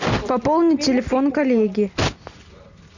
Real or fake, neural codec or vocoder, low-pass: real; none; 7.2 kHz